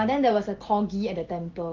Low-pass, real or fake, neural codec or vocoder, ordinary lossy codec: 7.2 kHz; real; none; Opus, 16 kbps